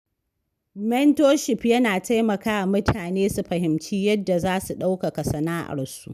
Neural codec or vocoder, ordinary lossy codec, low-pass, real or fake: none; none; 14.4 kHz; real